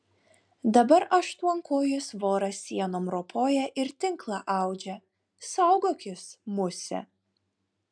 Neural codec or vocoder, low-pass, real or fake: none; 9.9 kHz; real